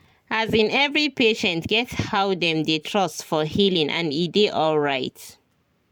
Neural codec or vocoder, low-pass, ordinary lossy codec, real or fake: vocoder, 44.1 kHz, 128 mel bands every 512 samples, BigVGAN v2; 19.8 kHz; none; fake